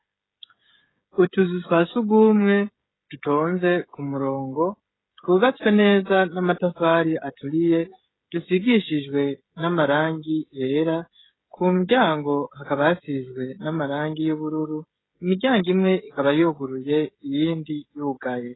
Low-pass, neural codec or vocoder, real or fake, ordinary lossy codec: 7.2 kHz; codec, 16 kHz, 16 kbps, FreqCodec, smaller model; fake; AAC, 16 kbps